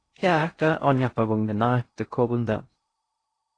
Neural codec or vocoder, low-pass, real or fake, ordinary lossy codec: codec, 16 kHz in and 24 kHz out, 0.6 kbps, FocalCodec, streaming, 2048 codes; 9.9 kHz; fake; AAC, 32 kbps